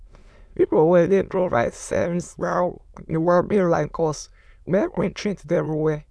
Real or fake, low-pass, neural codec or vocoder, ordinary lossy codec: fake; none; autoencoder, 22.05 kHz, a latent of 192 numbers a frame, VITS, trained on many speakers; none